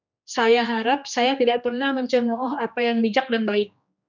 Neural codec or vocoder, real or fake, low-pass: codec, 16 kHz, 2 kbps, X-Codec, HuBERT features, trained on general audio; fake; 7.2 kHz